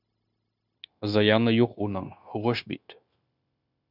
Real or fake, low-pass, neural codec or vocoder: fake; 5.4 kHz; codec, 16 kHz, 0.9 kbps, LongCat-Audio-Codec